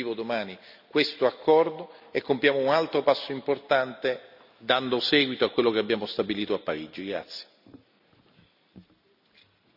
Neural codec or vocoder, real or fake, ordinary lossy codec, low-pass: none; real; none; 5.4 kHz